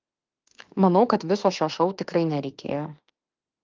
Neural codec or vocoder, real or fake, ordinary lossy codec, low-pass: autoencoder, 48 kHz, 32 numbers a frame, DAC-VAE, trained on Japanese speech; fake; Opus, 32 kbps; 7.2 kHz